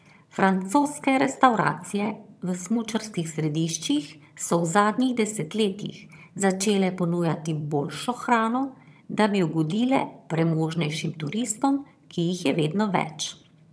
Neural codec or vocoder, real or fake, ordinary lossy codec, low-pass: vocoder, 22.05 kHz, 80 mel bands, HiFi-GAN; fake; none; none